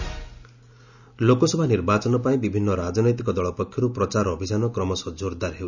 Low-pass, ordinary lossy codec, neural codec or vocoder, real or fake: 7.2 kHz; none; none; real